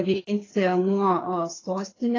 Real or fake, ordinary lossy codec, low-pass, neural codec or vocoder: fake; AAC, 32 kbps; 7.2 kHz; codec, 24 kHz, 6 kbps, HILCodec